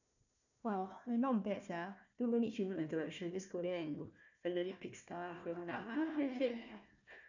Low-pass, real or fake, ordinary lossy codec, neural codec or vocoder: 7.2 kHz; fake; none; codec, 16 kHz, 1 kbps, FunCodec, trained on Chinese and English, 50 frames a second